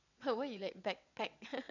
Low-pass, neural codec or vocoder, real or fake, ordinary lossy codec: 7.2 kHz; vocoder, 22.05 kHz, 80 mel bands, WaveNeXt; fake; none